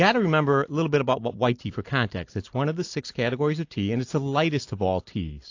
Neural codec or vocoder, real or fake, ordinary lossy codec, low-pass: none; real; AAC, 48 kbps; 7.2 kHz